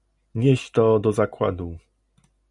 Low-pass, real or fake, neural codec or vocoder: 10.8 kHz; real; none